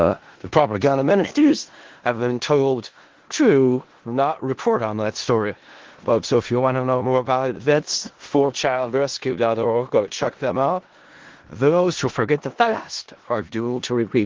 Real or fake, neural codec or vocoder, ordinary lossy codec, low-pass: fake; codec, 16 kHz in and 24 kHz out, 0.4 kbps, LongCat-Audio-Codec, four codebook decoder; Opus, 16 kbps; 7.2 kHz